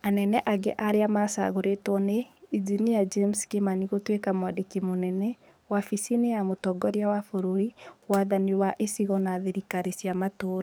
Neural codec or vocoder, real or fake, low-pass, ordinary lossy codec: codec, 44.1 kHz, 7.8 kbps, DAC; fake; none; none